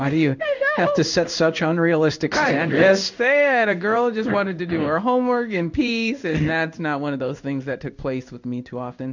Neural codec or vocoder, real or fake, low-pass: codec, 16 kHz in and 24 kHz out, 1 kbps, XY-Tokenizer; fake; 7.2 kHz